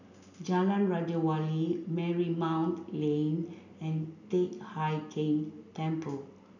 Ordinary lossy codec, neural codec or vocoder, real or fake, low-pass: none; none; real; 7.2 kHz